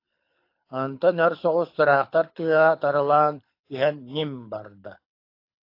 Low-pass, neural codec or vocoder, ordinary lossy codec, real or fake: 5.4 kHz; codec, 24 kHz, 6 kbps, HILCodec; AAC, 32 kbps; fake